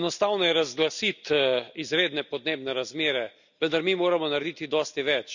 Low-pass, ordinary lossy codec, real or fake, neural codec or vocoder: 7.2 kHz; none; real; none